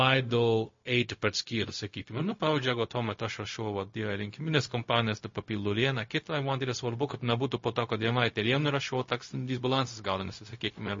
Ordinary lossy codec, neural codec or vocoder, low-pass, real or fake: MP3, 32 kbps; codec, 16 kHz, 0.4 kbps, LongCat-Audio-Codec; 7.2 kHz; fake